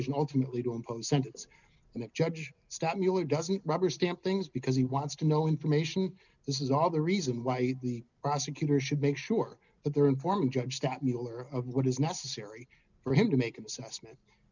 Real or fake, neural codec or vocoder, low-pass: real; none; 7.2 kHz